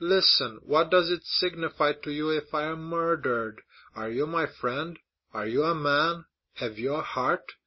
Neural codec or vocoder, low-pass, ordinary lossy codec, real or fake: none; 7.2 kHz; MP3, 24 kbps; real